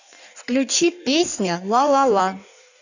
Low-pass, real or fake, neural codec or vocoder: 7.2 kHz; fake; codec, 16 kHz in and 24 kHz out, 1.1 kbps, FireRedTTS-2 codec